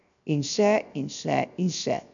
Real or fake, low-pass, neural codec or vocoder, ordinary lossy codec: fake; 7.2 kHz; codec, 16 kHz, 0.7 kbps, FocalCodec; none